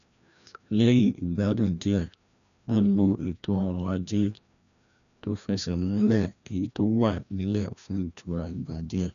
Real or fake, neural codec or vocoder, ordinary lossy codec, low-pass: fake; codec, 16 kHz, 1 kbps, FreqCodec, larger model; none; 7.2 kHz